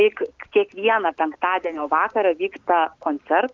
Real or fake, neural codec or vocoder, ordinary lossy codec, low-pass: real; none; Opus, 32 kbps; 7.2 kHz